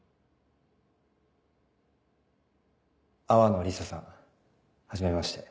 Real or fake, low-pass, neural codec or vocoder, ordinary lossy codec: real; none; none; none